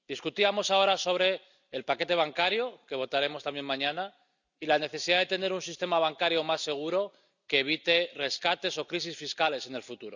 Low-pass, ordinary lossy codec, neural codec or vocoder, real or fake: 7.2 kHz; none; none; real